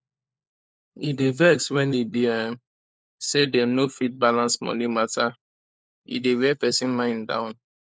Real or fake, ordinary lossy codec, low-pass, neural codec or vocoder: fake; none; none; codec, 16 kHz, 4 kbps, FunCodec, trained on LibriTTS, 50 frames a second